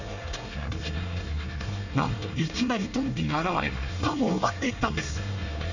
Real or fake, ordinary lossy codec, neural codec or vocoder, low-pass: fake; none; codec, 24 kHz, 1 kbps, SNAC; 7.2 kHz